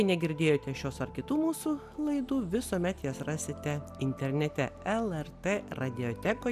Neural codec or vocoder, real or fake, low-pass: none; real; 14.4 kHz